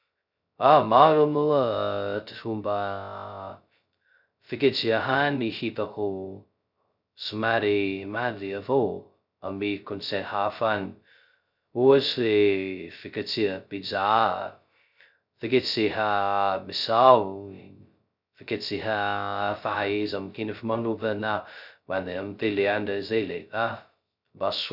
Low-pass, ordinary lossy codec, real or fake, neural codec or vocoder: 5.4 kHz; none; fake; codec, 16 kHz, 0.2 kbps, FocalCodec